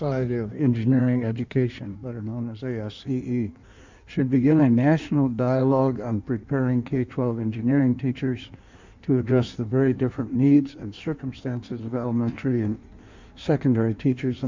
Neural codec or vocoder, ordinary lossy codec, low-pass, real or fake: codec, 16 kHz in and 24 kHz out, 1.1 kbps, FireRedTTS-2 codec; MP3, 64 kbps; 7.2 kHz; fake